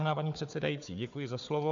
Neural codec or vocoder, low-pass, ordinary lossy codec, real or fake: codec, 16 kHz, 4 kbps, FunCodec, trained on Chinese and English, 50 frames a second; 7.2 kHz; AAC, 64 kbps; fake